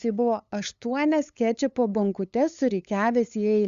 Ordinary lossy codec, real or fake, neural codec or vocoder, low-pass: Opus, 64 kbps; fake; codec, 16 kHz, 8 kbps, FunCodec, trained on LibriTTS, 25 frames a second; 7.2 kHz